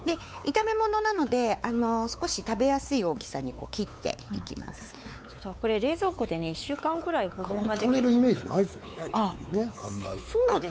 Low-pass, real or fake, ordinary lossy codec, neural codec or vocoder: none; fake; none; codec, 16 kHz, 4 kbps, X-Codec, WavLM features, trained on Multilingual LibriSpeech